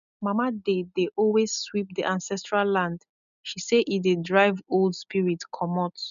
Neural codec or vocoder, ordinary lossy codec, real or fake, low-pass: none; none; real; 7.2 kHz